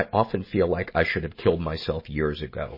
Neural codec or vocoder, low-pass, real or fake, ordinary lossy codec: none; 5.4 kHz; real; MP3, 24 kbps